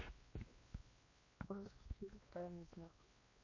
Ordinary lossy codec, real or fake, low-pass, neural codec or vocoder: MP3, 32 kbps; fake; 7.2 kHz; codec, 16 kHz, 1 kbps, X-Codec, WavLM features, trained on Multilingual LibriSpeech